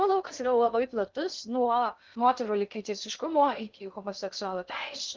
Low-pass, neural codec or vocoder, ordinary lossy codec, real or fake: 7.2 kHz; codec, 16 kHz in and 24 kHz out, 0.6 kbps, FocalCodec, streaming, 2048 codes; Opus, 32 kbps; fake